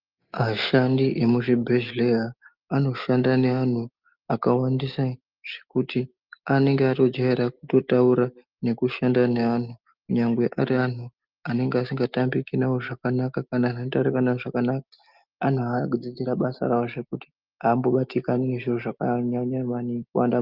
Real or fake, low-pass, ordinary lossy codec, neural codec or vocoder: real; 5.4 kHz; Opus, 24 kbps; none